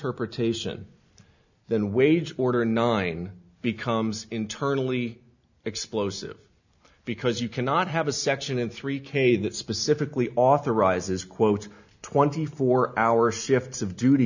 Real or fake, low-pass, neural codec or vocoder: real; 7.2 kHz; none